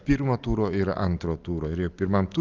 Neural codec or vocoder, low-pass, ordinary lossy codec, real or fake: none; 7.2 kHz; Opus, 32 kbps; real